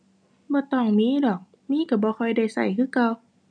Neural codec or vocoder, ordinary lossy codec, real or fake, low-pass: none; none; real; 9.9 kHz